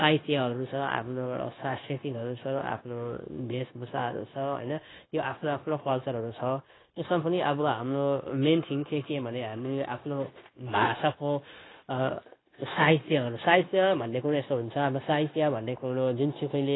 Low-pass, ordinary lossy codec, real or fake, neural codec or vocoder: 7.2 kHz; AAC, 16 kbps; fake; codec, 16 kHz, 0.9 kbps, LongCat-Audio-Codec